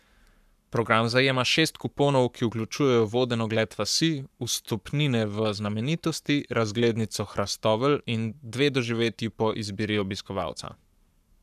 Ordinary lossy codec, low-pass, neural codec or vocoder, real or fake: none; 14.4 kHz; codec, 44.1 kHz, 7.8 kbps, Pupu-Codec; fake